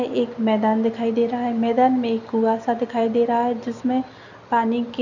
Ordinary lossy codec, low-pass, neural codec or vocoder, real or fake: none; 7.2 kHz; none; real